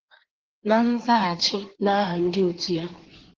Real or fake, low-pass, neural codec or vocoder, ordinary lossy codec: fake; 7.2 kHz; codec, 16 kHz in and 24 kHz out, 1.1 kbps, FireRedTTS-2 codec; Opus, 16 kbps